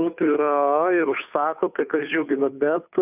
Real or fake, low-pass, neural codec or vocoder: fake; 3.6 kHz; codec, 16 kHz, 2 kbps, FunCodec, trained on Chinese and English, 25 frames a second